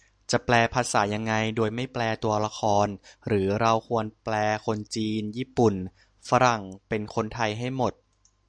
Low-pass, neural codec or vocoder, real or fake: 9.9 kHz; none; real